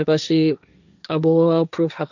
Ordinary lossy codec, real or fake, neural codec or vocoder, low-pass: none; fake; codec, 16 kHz, 1.1 kbps, Voila-Tokenizer; 7.2 kHz